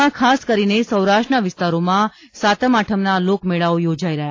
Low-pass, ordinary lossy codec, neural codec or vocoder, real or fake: 7.2 kHz; AAC, 32 kbps; none; real